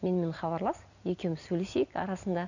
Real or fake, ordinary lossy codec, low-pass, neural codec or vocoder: real; none; 7.2 kHz; none